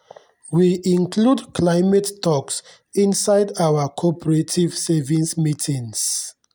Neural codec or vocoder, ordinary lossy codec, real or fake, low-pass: none; none; real; none